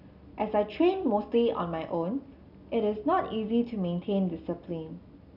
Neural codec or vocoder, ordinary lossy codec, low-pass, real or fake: none; none; 5.4 kHz; real